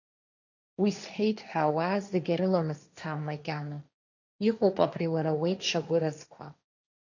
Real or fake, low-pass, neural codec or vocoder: fake; 7.2 kHz; codec, 16 kHz, 1.1 kbps, Voila-Tokenizer